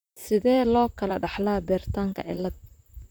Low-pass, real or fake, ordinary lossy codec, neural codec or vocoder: none; fake; none; vocoder, 44.1 kHz, 128 mel bands, Pupu-Vocoder